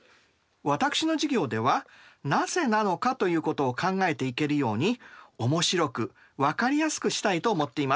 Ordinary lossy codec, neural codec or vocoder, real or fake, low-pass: none; none; real; none